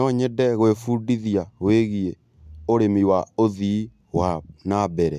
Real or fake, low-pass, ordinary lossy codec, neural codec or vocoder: real; 14.4 kHz; MP3, 96 kbps; none